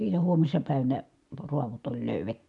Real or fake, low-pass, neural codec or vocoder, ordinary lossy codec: real; 10.8 kHz; none; none